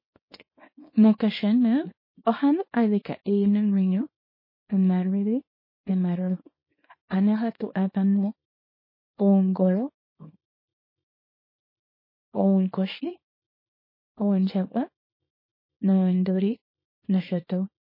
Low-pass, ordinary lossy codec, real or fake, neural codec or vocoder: 5.4 kHz; MP3, 24 kbps; fake; codec, 24 kHz, 0.9 kbps, WavTokenizer, small release